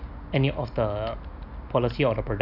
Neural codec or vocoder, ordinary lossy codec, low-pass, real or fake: none; none; 5.4 kHz; real